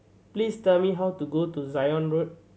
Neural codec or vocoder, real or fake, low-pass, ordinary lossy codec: none; real; none; none